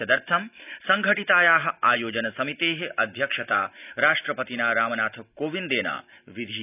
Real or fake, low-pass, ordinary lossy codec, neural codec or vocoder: real; 3.6 kHz; none; none